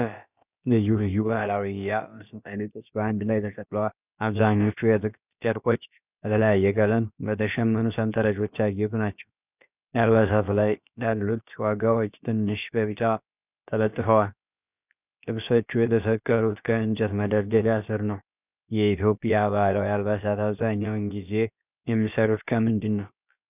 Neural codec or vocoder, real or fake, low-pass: codec, 16 kHz, about 1 kbps, DyCAST, with the encoder's durations; fake; 3.6 kHz